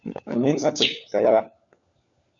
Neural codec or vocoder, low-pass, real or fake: codec, 16 kHz, 4 kbps, FunCodec, trained on LibriTTS, 50 frames a second; 7.2 kHz; fake